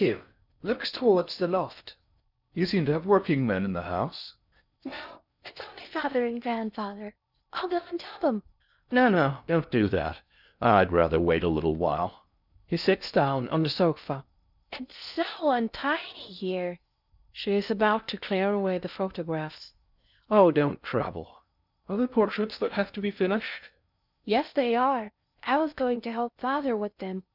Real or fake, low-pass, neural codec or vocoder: fake; 5.4 kHz; codec, 16 kHz in and 24 kHz out, 0.6 kbps, FocalCodec, streaming, 4096 codes